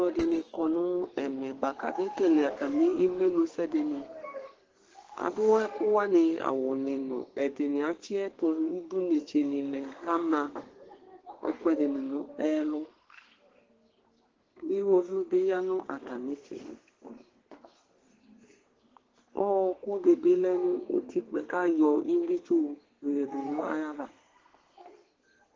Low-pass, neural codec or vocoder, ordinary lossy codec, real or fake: 7.2 kHz; codec, 32 kHz, 1.9 kbps, SNAC; Opus, 16 kbps; fake